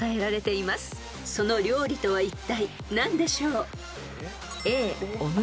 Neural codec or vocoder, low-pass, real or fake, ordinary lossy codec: none; none; real; none